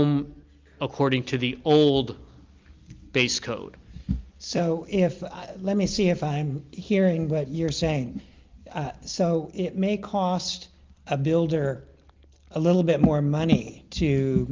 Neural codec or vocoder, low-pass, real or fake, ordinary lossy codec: none; 7.2 kHz; real; Opus, 32 kbps